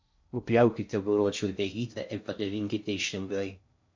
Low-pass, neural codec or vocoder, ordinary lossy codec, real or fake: 7.2 kHz; codec, 16 kHz in and 24 kHz out, 0.6 kbps, FocalCodec, streaming, 2048 codes; MP3, 48 kbps; fake